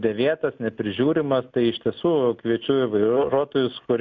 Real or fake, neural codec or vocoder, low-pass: real; none; 7.2 kHz